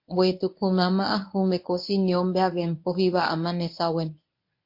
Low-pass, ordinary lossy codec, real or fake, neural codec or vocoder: 5.4 kHz; MP3, 32 kbps; fake; codec, 24 kHz, 0.9 kbps, WavTokenizer, medium speech release version 1